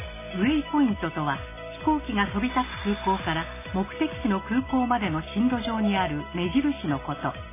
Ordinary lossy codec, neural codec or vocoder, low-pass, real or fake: MP3, 16 kbps; none; 3.6 kHz; real